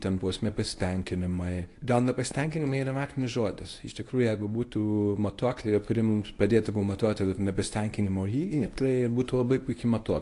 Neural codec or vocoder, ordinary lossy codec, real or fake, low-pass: codec, 24 kHz, 0.9 kbps, WavTokenizer, medium speech release version 1; AAC, 48 kbps; fake; 10.8 kHz